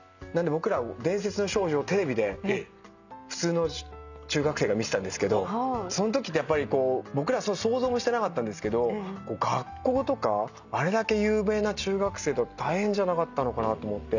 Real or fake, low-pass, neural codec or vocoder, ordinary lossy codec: real; 7.2 kHz; none; none